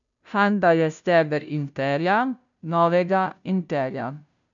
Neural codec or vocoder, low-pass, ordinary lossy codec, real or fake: codec, 16 kHz, 0.5 kbps, FunCodec, trained on Chinese and English, 25 frames a second; 7.2 kHz; none; fake